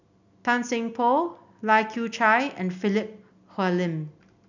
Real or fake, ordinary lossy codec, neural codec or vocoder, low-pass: real; none; none; 7.2 kHz